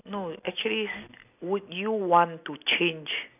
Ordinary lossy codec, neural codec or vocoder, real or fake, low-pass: none; none; real; 3.6 kHz